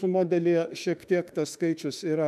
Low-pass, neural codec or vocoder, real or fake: 14.4 kHz; autoencoder, 48 kHz, 32 numbers a frame, DAC-VAE, trained on Japanese speech; fake